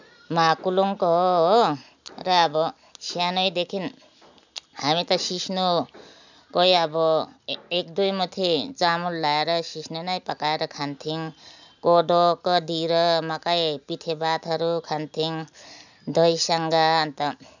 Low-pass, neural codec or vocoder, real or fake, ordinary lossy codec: 7.2 kHz; none; real; none